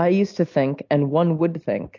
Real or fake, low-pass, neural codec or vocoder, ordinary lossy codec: real; 7.2 kHz; none; AAC, 48 kbps